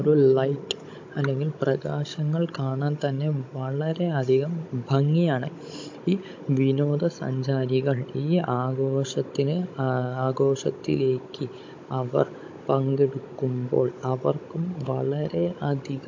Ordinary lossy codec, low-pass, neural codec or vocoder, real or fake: AAC, 48 kbps; 7.2 kHz; codec, 16 kHz, 16 kbps, FunCodec, trained on Chinese and English, 50 frames a second; fake